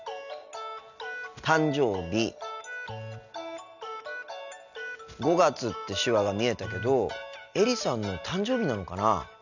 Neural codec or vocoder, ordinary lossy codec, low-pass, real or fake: none; none; 7.2 kHz; real